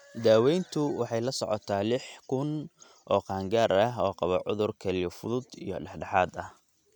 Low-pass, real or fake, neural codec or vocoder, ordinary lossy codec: 19.8 kHz; real; none; none